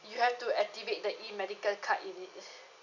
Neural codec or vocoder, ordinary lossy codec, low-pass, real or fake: none; none; 7.2 kHz; real